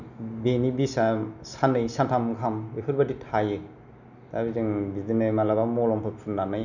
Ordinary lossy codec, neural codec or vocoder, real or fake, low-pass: none; none; real; 7.2 kHz